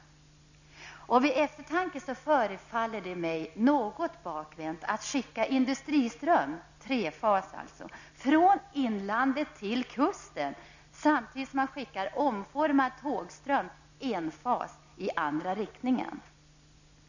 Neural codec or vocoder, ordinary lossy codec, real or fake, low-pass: none; none; real; 7.2 kHz